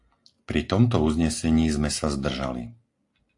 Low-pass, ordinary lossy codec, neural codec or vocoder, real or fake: 10.8 kHz; AAC, 48 kbps; none; real